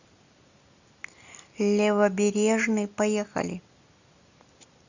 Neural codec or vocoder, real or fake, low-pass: none; real; 7.2 kHz